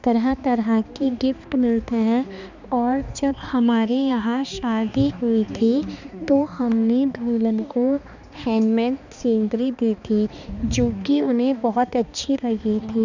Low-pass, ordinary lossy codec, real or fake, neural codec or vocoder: 7.2 kHz; none; fake; codec, 16 kHz, 2 kbps, X-Codec, HuBERT features, trained on balanced general audio